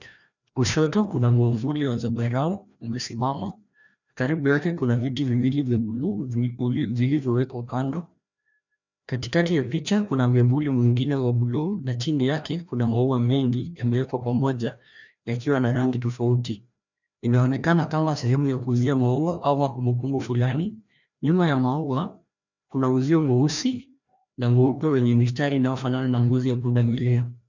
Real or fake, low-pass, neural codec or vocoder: fake; 7.2 kHz; codec, 16 kHz, 1 kbps, FreqCodec, larger model